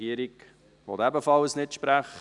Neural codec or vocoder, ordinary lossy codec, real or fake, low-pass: none; none; real; 10.8 kHz